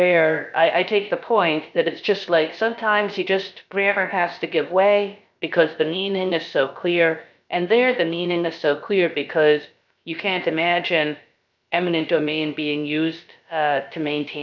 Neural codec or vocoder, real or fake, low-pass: codec, 16 kHz, about 1 kbps, DyCAST, with the encoder's durations; fake; 7.2 kHz